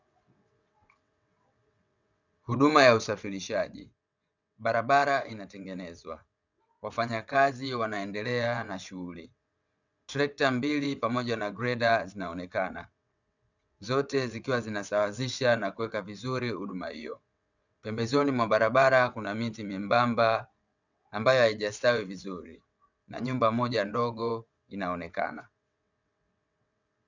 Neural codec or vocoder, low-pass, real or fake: vocoder, 22.05 kHz, 80 mel bands, WaveNeXt; 7.2 kHz; fake